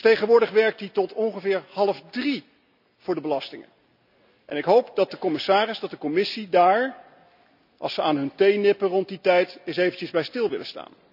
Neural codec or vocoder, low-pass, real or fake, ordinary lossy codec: none; 5.4 kHz; real; none